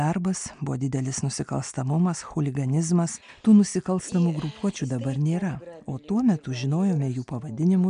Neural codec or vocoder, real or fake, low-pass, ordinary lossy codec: none; real; 9.9 kHz; AAC, 96 kbps